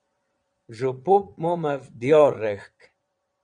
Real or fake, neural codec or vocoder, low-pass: fake; vocoder, 22.05 kHz, 80 mel bands, Vocos; 9.9 kHz